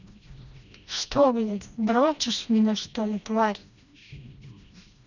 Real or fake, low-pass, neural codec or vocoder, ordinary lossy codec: fake; 7.2 kHz; codec, 16 kHz, 1 kbps, FreqCodec, smaller model; none